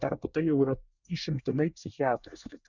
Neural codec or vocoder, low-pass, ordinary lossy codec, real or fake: codec, 24 kHz, 1 kbps, SNAC; 7.2 kHz; Opus, 64 kbps; fake